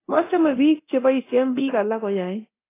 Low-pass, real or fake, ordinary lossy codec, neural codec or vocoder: 3.6 kHz; fake; AAC, 24 kbps; codec, 24 kHz, 0.9 kbps, DualCodec